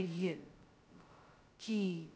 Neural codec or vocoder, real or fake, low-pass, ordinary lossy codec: codec, 16 kHz, 0.2 kbps, FocalCodec; fake; none; none